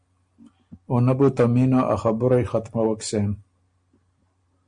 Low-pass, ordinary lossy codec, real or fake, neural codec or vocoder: 9.9 kHz; Opus, 64 kbps; real; none